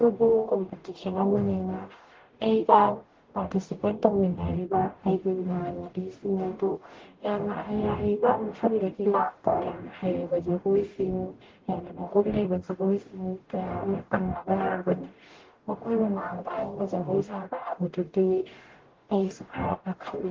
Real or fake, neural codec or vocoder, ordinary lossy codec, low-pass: fake; codec, 44.1 kHz, 0.9 kbps, DAC; Opus, 16 kbps; 7.2 kHz